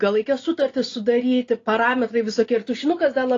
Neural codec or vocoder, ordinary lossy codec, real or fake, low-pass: none; AAC, 32 kbps; real; 7.2 kHz